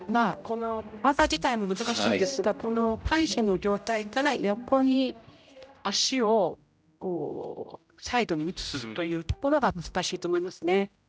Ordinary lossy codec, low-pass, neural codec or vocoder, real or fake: none; none; codec, 16 kHz, 0.5 kbps, X-Codec, HuBERT features, trained on general audio; fake